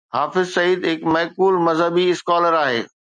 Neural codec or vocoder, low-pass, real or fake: none; 9.9 kHz; real